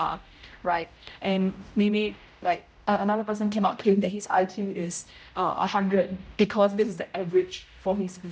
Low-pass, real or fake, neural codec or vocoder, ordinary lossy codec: none; fake; codec, 16 kHz, 0.5 kbps, X-Codec, HuBERT features, trained on general audio; none